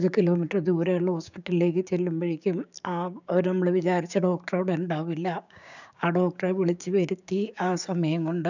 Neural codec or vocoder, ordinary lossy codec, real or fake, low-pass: codec, 16 kHz, 6 kbps, DAC; none; fake; 7.2 kHz